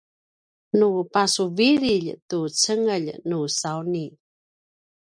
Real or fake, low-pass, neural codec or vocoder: real; 9.9 kHz; none